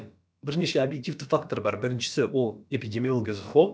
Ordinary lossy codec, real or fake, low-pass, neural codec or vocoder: none; fake; none; codec, 16 kHz, about 1 kbps, DyCAST, with the encoder's durations